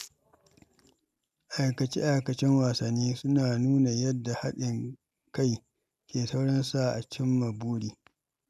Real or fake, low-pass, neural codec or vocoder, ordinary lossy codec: real; 14.4 kHz; none; none